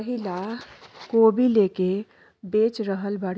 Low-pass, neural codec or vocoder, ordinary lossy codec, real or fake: none; none; none; real